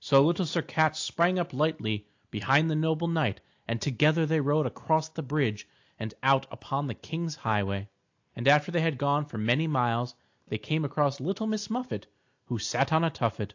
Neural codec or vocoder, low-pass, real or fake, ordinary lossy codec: none; 7.2 kHz; real; AAC, 48 kbps